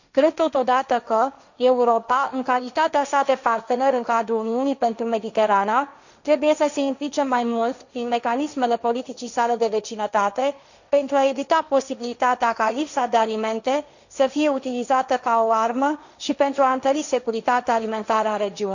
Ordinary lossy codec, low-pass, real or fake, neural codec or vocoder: none; 7.2 kHz; fake; codec, 16 kHz, 1.1 kbps, Voila-Tokenizer